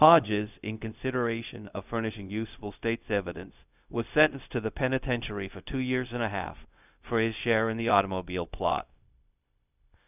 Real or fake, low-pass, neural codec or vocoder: fake; 3.6 kHz; codec, 16 kHz, 0.4 kbps, LongCat-Audio-Codec